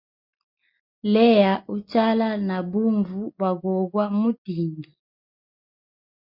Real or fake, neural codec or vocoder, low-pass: real; none; 5.4 kHz